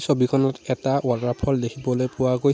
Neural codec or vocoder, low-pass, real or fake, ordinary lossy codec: none; none; real; none